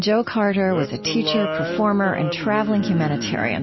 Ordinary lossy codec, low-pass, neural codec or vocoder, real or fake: MP3, 24 kbps; 7.2 kHz; none; real